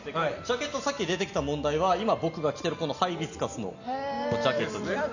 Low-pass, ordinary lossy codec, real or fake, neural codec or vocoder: 7.2 kHz; none; real; none